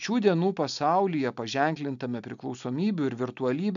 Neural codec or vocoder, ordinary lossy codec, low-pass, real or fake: none; MP3, 96 kbps; 7.2 kHz; real